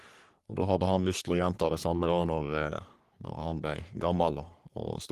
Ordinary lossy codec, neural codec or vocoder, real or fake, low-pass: Opus, 24 kbps; codec, 44.1 kHz, 3.4 kbps, Pupu-Codec; fake; 14.4 kHz